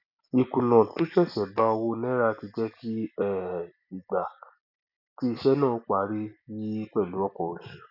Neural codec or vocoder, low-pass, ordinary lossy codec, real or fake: none; 5.4 kHz; none; real